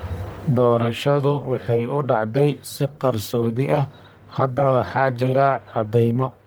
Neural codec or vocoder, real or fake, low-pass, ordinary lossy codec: codec, 44.1 kHz, 1.7 kbps, Pupu-Codec; fake; none; none